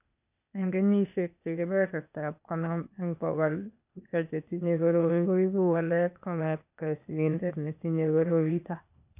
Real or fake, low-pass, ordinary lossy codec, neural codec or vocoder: fake; 3.6 kHz; none; codec, 16 kHz, 0.8 kbps, ZipCodec